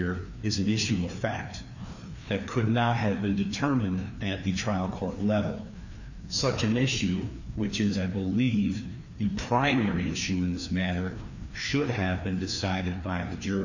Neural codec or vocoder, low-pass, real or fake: codec, 16 kHz, 2 kbps, FreqCodec, larger model; 7.2 kHz; fake